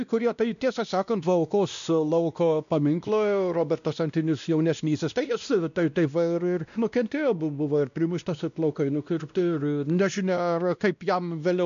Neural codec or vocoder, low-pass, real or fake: codec, 16 kHz, 1 kbps, X-Codec, WavLM features, trained on Multilingual LibriSpeech; 7.2 kHz; fake